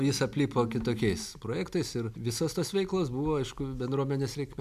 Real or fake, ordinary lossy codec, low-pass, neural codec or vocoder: real; MP3, 96 kbps; 14.4 kHz; none